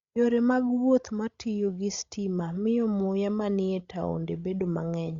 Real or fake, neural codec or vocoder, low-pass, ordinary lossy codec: fake; codec, 16 kHz, 16 kbps, FreqCodec, larger model; 7.2 kHz; Opus, 64 kbps